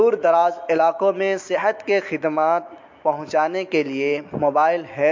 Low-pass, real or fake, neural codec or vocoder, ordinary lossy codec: 7.2 kHz; real; none; MP3, 48 kbps